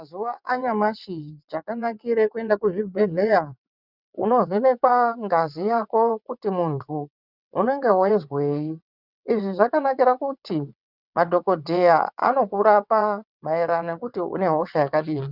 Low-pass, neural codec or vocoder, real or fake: 5.4 kHz; vocoder, 22.05 kHz, 80 mel bands, WaveNeXt; fake